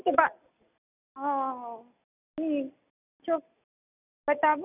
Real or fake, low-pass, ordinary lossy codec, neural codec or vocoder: real; 3.6 kHz; none; none